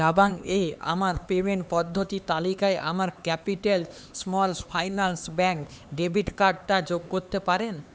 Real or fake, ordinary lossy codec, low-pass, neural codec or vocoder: fake; none; none; codec, 16 kHz, 4 kbps, X-Codec, HuBERT features, trained on LibriSpeech